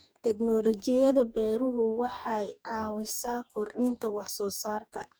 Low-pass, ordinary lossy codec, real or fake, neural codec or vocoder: none; none; fake; codec, 44.1 kHz, 2.6 kbps, DAC